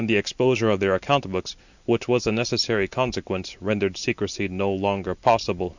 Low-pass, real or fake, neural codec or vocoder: 7.2 kHz; real; none